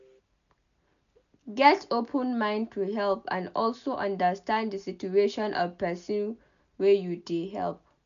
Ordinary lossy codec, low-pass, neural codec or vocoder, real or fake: none; 7.2 kHz; none; real